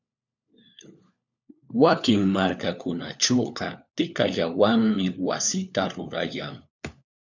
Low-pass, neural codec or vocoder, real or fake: 7.2 kHz; codec, 16 kHz, 4 kbps, FunCodec, trained on LibriTTS, 50 frames a second; fake